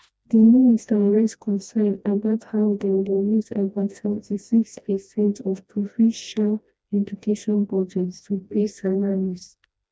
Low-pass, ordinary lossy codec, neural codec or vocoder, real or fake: none; none; codec, 16 kHz, 1 kbps, FreqCodec, smaller model; fake